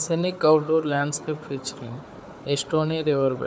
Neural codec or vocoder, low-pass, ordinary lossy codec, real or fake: codec, 16 kHz, 4 kbps, FunCodec, trained on Chinese and English, 50 frames a second; none; none; fake